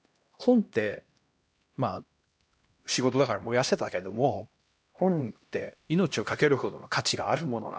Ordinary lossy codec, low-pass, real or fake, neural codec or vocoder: none; none; fake; codec, 16 kHz, 1 kbps, X-Codec, HuBERT features, trained on LibriSpeech